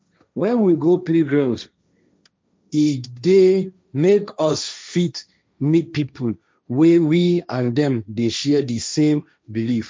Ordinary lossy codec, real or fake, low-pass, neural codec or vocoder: none; fake; none; codec, 16 kHz, 1.1 kbps, Voila-Tokenizer